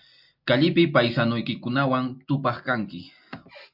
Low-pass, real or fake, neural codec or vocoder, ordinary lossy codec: 5.4 kHz; real; none; MP3, 48 kbps